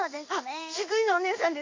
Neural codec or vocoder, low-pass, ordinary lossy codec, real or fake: codec, 16 kHz in and 24 kHz out, 1 kbps, XY-Tokenizer; 7.2 kHz; AAC, 48 kbps; fake